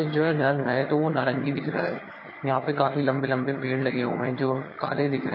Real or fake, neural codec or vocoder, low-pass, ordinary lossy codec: fake; vocoder, 22.05 kHz, 80 mel bands, HiFi-GAN; 5.4 kHz; MP3, 24 kbps